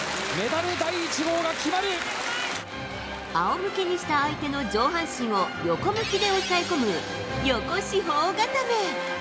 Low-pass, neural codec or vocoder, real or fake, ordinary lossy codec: none; none; real; none